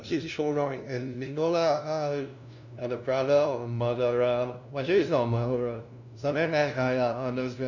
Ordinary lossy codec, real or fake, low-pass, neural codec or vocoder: none; fake; 7.2 kHz; codec, 16 kHz, 0.5 kbps, FunCodec, trained on LibriTTS, 25 frames a second